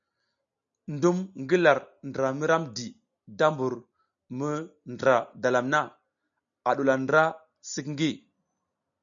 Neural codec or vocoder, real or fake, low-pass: none; real; 7.2 kHz